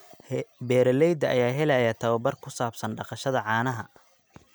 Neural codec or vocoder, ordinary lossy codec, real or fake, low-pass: none; none; real; none